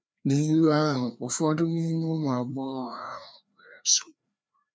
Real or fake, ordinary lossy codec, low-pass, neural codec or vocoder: fake; none; none; codec, 16 kHz, 2 kbps, FreqCodec, larger model